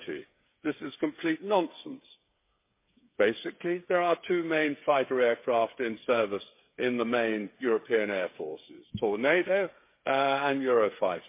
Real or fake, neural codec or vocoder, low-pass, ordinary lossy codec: fake; codec, 16 kHz, 8 kbps, FreqCodec, smaller model; 3.6 kHz; MP3, 24 kbps